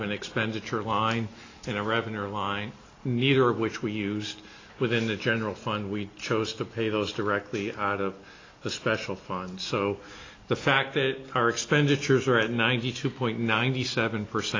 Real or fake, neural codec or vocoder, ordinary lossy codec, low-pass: real; none; AAC, 32 kbps; 7.2 kHz